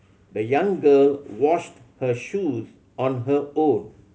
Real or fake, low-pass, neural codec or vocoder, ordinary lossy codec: real; none; none; none